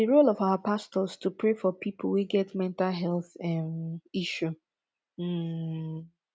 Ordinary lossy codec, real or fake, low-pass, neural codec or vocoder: none; real; none; none